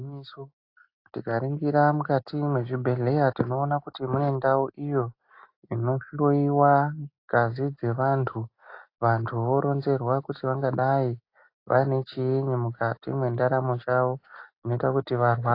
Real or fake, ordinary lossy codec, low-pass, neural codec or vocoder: real; AAC, 32 kbps; 5.4 kHz; none